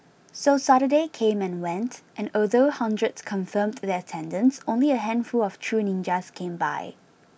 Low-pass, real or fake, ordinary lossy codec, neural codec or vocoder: none; real; none; none